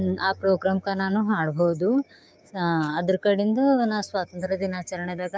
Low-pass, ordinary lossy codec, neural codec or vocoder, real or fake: none; none; codec, 16 kHz, 6 kbps, DAC; fake